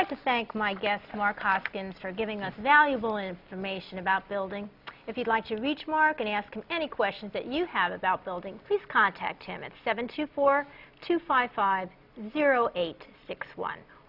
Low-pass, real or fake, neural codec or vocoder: 5.4 kHz; real; none